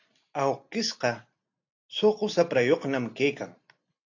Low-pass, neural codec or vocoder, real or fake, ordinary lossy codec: 7.2 kHz; none; real; AAC, 48 kbps